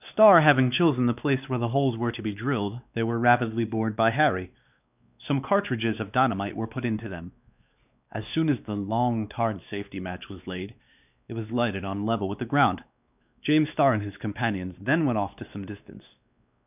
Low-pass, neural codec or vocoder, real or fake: 3.6 kHz; codec, 16 kHz, 2 kbps, X-Codec, WavLM features, trained on Multilingual LibriSpeech; fake